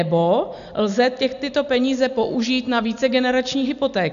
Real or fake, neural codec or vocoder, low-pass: real; none; 7.2 kHz